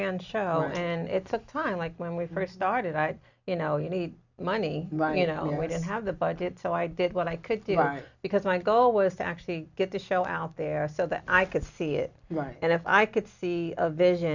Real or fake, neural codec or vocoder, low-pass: real; none; 7.2 kHz